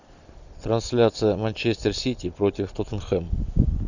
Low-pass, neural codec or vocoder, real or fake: 7.2 kHz; none; real